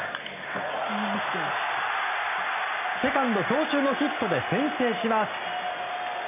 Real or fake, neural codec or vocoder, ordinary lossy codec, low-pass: real; none; none; 3.6 kHz